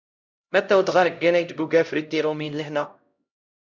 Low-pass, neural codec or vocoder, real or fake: 7.2 kHz; codec, 16 kHz, 0.5 kbps, X-Codec, HuBERT features, trained on LibriSpeech; fake